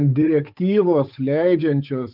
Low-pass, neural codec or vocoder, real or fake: 5.4 kHz; codec, 16 kHz, 8 kbps, FunCodec, trained on Chinese and English, 25 frames a second; fake